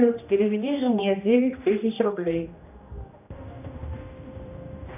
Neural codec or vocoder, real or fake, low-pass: codec, 16 kHz, 1 kbps, X-Codec, HuBERT features, trained on general audio; fake; 3.6 kHz